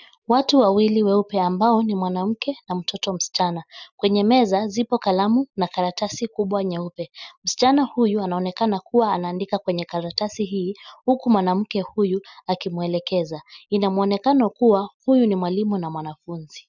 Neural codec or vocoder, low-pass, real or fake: none; 7.2 kHz; real